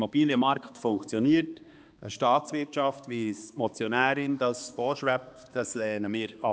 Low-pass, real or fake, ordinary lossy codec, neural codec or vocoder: none; fake; none; codec, 16 kHz, 2 kbps, X-Codec, HuBERT features, trained on balanced general audio